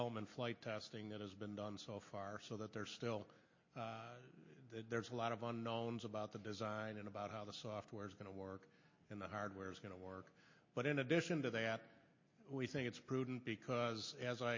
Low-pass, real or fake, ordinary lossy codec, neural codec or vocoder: 7.2 kHz; real; MP3, 32 kbps; none